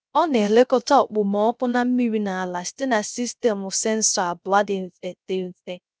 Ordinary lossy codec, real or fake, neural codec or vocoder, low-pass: none; fake; codec, 16 kHz, 0.3 kbps, FocalCodec; none